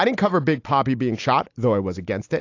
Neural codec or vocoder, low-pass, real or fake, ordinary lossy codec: none; 7.2 kHz; real; AAC, 48 kbps